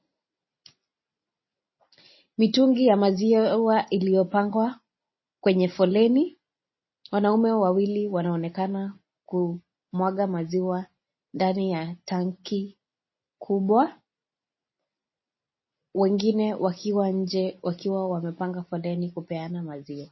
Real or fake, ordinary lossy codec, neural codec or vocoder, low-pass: real; MP3, 24 kbps; none; 7.2 kHz